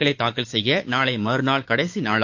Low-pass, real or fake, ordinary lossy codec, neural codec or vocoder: 7.2 kHz; fake; AAC, 48 kbps; codec, 16 kHz, 6 kbps, DAC